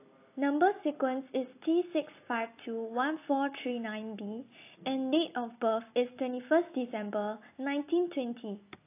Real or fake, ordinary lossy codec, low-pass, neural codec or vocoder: real; AAC, 24 kbps; 3.6 kHz; none